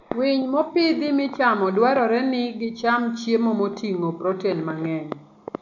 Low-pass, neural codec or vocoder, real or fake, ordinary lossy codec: 7.2 kHz; none; real; MP3, 64 kbps